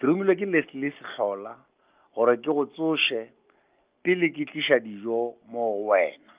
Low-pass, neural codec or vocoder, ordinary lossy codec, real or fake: 3.6 kHz; none; Opus, 24 kbps; real